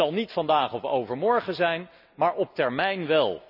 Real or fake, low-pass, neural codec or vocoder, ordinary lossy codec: real; 5.4 kHz; none; none